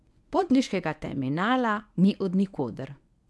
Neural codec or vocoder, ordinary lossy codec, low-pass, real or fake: codec, 24 kHz, 0.9 kbps, WavTokenizer, medium speech release version 1; none; none; fake